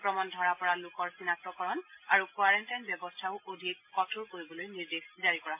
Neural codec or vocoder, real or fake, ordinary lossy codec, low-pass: none; real; MP3, 24 kbps; 3.6 kHz